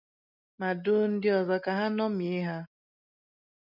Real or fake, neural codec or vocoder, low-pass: real; none; 5.4 kHz